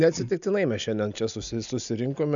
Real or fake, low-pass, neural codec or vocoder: real; 7.2 kHz; none